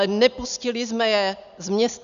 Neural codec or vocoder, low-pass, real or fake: none; 7.2 kHz; real